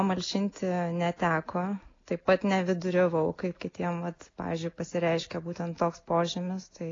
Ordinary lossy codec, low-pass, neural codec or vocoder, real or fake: AAC, 32 kbps; 7.2 kHz; none; real